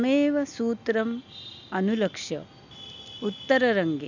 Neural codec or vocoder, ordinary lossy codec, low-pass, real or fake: none; none; 7.2 kHz; real